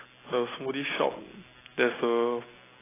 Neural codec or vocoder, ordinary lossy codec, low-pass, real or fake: none; AAC, 16 kbps; 3.6 kHz; real